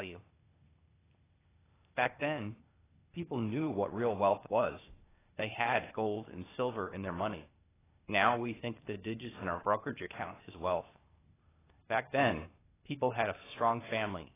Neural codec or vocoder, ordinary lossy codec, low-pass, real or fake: codec, 16 kHz, 0.8 kbps, ZipCodec; AAC, 16 kbps; 3.6 kHz; fake